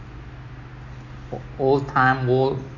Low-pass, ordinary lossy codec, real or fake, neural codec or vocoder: 7.2 kHz; none; real; none